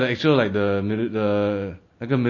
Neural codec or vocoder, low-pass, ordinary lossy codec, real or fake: none; 7.2 kHz; MP3, 32 kbps; real